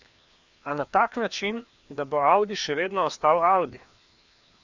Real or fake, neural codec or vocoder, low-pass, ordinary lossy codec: fake; codec, 16 kHz, 2 kbps, FreqCodec, larger model; 7.2 kHz; Opus, 64 kbps